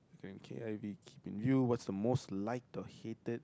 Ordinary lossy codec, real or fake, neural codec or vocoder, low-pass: none; real; none; none